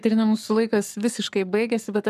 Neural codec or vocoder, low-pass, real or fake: codec, 44.1 kHz, 7.8 kbps, Pupu-Codec; 14.4 kHz; fake